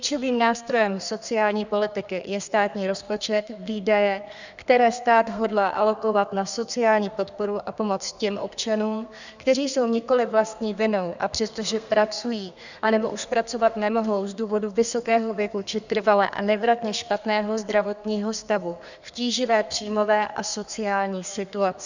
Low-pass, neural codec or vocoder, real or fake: 7.2 kHz; codec, 32 kHz, 1.9 kbps, SNAC; fake